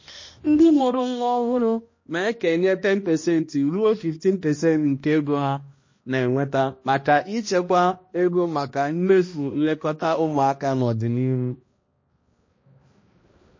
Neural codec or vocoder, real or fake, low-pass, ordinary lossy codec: codec, 16 kHz, 1 kbps, X-Codec, HuBERT features, trained on balanced general audio; fake; 7.2 kHz; MP3, 32 kbps